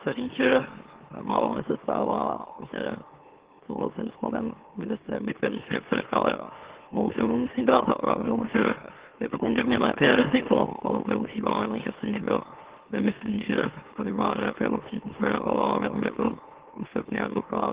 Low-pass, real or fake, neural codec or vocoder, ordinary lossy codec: 3.6 kHz; fake; autoencoder, 44.1 kHz, a latent of 192 numbers a frame, MeloTTS; Opus, 16 kbps